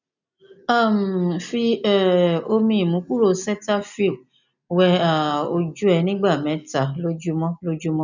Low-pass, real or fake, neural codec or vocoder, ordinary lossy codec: 7.2 kHz; real; none; none